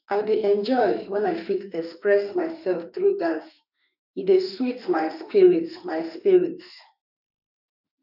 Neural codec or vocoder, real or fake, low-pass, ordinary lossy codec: autoencoder, 48 kHz, 32 numbers a frame, DAC-VAE, trained on Japanese speech; fake; 5.4 kHz; none